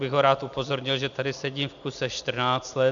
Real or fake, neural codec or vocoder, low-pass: real; none; 7.2 kHz